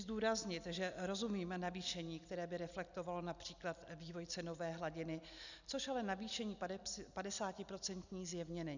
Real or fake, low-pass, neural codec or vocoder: real; 7.2 kHz; none